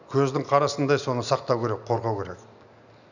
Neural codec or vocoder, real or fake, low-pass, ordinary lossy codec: none; real; 7.2 kHz; none